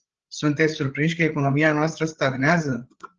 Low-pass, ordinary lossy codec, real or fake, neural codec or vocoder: 7.2 kHz; Opus, 16 kbps; fake; codec, 16 kHz, 8 kbps, FreqCodec, larger model